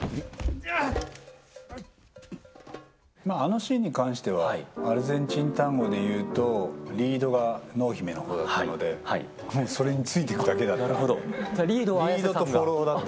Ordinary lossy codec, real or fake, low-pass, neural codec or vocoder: none; real; none; none